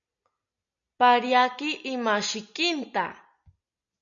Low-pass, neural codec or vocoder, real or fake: 7.2 kHz; none; real